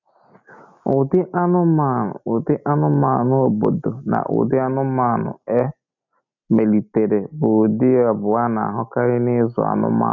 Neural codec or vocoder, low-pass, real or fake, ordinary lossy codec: none; 7.2 kHz; real; none